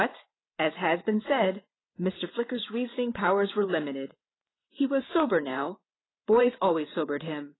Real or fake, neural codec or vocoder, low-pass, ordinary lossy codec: fake; vocoder, 44.1 kHz, 128 mel bands every 512 samples, BigVGAN v2; 7.2 kHz; AAC, 16 kbps